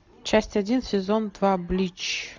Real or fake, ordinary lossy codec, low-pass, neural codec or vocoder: real; MP3, 64 kbps; 7.2 kHz; none